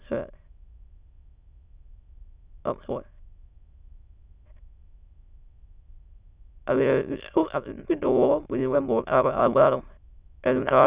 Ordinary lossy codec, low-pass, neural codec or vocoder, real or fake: Opus, 64 kbps; 3.6 kHz; autoencoder, 22.05 kHz, a latent of 192 numbers a frame, VITS, trained on many speakers; fake